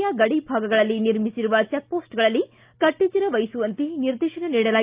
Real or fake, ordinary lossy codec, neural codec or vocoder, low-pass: real; Opus, 24 kbps; none; 3.6 kHz